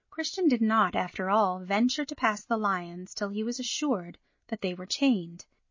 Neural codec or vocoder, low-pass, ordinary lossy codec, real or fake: none; 7.2 kHz; MP3, 32 kbps; real